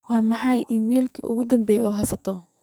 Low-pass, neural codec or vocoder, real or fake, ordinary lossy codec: none; codec, 44.1 kHz, 2.6 kbps, SNAC; fake; none